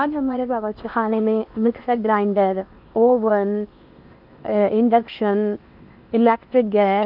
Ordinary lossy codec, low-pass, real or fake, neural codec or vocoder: none; 5.4 kHz; fake; codec, 16 kHz in and 24 kHz out, 0.8 kbps, FocalCodec, streaming, 65536 codes